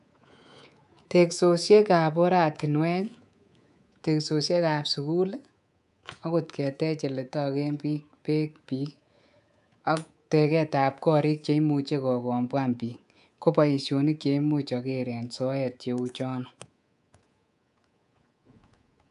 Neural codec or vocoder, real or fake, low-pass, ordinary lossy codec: codec, 24 kHz, 3.1 kbps, DualCodec; fake; 10.8 kHz; none